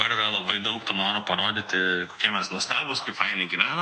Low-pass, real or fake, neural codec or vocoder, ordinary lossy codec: 10.8 kHz; fake; codec, 24 kHz, 1.2 kbps, DualCodec; MP3, 64 kbps